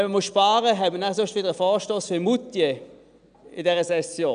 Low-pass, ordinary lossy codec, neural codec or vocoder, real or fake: 9.9 kHz; none; none; real